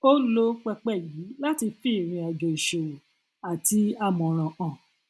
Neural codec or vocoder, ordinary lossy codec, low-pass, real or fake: none; none; none; real